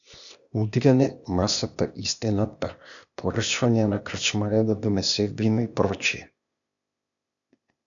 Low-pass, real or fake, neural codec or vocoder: 7.2 kHz; fake; codec, 16 kHz, 0.8 kbps, ZipCodec